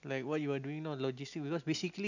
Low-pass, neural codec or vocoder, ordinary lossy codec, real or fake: 7.2 kHz; none; none; real